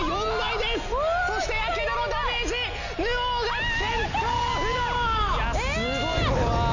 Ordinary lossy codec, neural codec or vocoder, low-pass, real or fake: none; none; 7.2 kHz; real